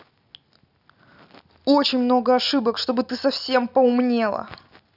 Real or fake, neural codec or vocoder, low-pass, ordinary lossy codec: real; none; 5.4 kHz; none